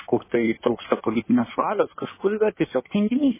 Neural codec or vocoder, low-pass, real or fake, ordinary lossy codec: codec, 16 kHz in and 24 kHz out, 1.1 kbps, FireRedTTS-2 codec; 3.6 kHz; fake; MP3, 16 kbps